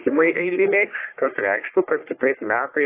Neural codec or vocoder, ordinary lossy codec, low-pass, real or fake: codec, 44.1 kHz, 1.7 kbps, Pupu-Codec; AAC, 24 kbps; 3.6 kHz; fake